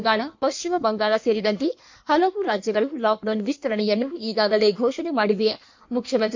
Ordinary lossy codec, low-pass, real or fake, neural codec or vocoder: MP3, 48 kbps; 7.2 kHz; fake; codec, 16 kHz in and 24 kHz out, 1.1 kbps, FireRedTTS-2 codec